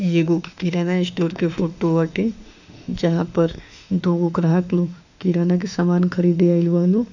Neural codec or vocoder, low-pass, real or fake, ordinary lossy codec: autoencoder, 48 kHz, 32 numbers a frame, DAC-VAE, trained on Japanese speech; 7.2 kHz; fake; none